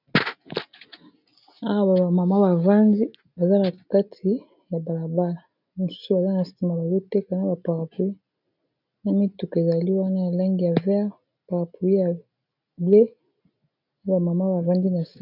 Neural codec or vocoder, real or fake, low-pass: none; real; 5.4 kHz